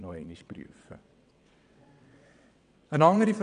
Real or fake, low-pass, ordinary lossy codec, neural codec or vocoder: fake; 9.9 kHz; none; vocoder, 22.05 kHz, 80 mel bands, WaveNeXt